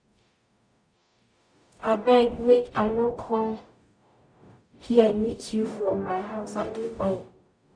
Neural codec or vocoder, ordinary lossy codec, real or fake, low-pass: codec, 44.1 kHz, 0.9 kbps, DAC; none; fake; 9.9 kHz